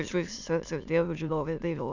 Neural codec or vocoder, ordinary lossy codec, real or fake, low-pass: autoencoder, 22.05 kHz, a latent of 192 numbers a frame, VITS, trained on many speakers; none; fake; 7.2 kHz